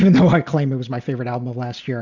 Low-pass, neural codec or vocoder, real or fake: 7.2 kHz; none; real